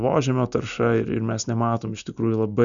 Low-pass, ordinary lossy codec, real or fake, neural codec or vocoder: 7.2 kHz; MP3, 96 kbps; real; none